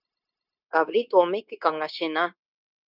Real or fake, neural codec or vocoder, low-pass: fake; codec, 16 kHz, 0.9 kbps, LongCat-Audio-Codec; 5.4 kHz